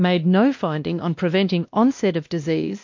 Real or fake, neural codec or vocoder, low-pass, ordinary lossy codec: fake; codec, 16 kHz, 1 kbps, X-Codec, WavLM features, trained on Multilingual LibriSpeech; 7.2 kHz; MP3, 48 kbps